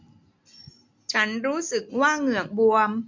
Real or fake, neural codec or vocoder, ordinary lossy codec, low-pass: real; none; MP3, 48 kbps; 7.2 kHz